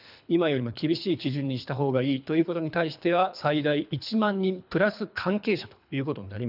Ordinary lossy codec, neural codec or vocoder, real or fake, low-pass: none; codec, 24 kHz, 3 kbps, HILCodec; fake; 5.4 kHz